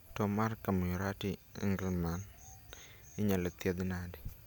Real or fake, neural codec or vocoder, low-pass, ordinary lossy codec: real; none; none; none